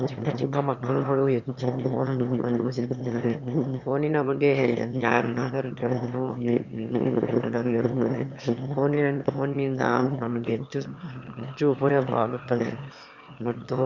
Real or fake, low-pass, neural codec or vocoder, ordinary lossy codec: fake; 7.2 kHz; autoencoder, 22.05 kHz, a latent of 192 numbers a frame, VITS, trained on one speaker; Opus, 64 kbps